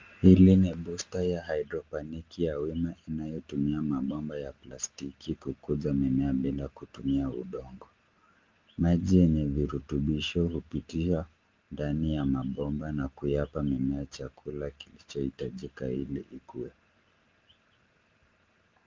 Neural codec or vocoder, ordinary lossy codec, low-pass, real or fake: none; Opus, 32 kbps; 7.2 kHz; real